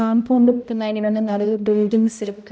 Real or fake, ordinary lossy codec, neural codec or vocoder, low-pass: fake; none; codec, 16 kHz, 0.5 kbps, X-Codec, HuBERT features, trained on balanced general audio; none